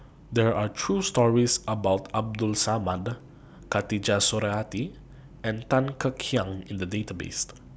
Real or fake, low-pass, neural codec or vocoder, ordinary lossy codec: real; none; none; none